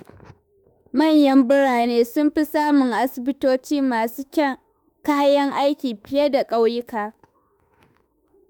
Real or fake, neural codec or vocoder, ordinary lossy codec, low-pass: fake; autoencoder, 48 kHz, 32 numbers a frame, DAC-VAE, trained on Japanese speech; none; none